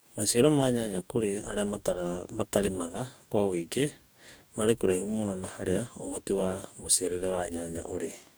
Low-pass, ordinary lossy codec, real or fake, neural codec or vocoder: none; none; fake; codec, 44.1 kHz, 2.6 kbps, DAC